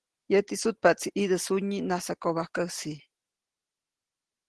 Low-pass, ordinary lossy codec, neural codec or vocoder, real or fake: 10.8 kHz; Opus, 16 kbps; none; real